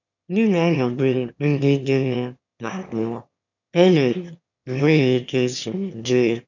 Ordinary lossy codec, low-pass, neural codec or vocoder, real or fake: none; 7.2 kHz; autoencoder, 22.05 kHz, a latent of 192 numbers a frame, VITS, trained on one speaker; fake